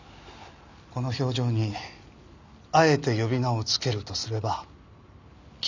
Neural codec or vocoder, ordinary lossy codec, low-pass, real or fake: none; none; 7.2 kHz; real